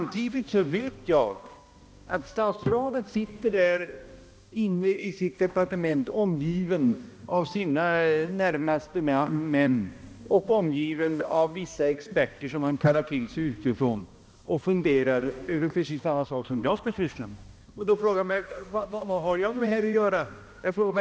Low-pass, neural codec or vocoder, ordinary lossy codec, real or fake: none; codec, 16 kHz, 1 kbps, X-Codec, HuBERT features, trained on balanced general audio; none; fake